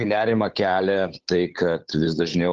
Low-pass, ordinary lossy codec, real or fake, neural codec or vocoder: 7.2 kHz; Opus, 16 kbps; fake; codec, 16 kHz, 8 kbps, FunCodec, trained on Chinese and English, 25 frames a second